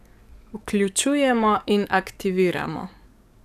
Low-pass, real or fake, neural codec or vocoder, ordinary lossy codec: 14.4 kHz; fake; codec, 44.1 kHz, 7.8 kbps, DAC; none